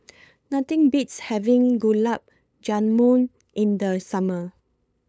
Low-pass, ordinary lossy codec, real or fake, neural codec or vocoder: none; none; fake; codec, 16 kHz, 8 kbps, FunCodec, trained on LibriTTS, 25 frames a second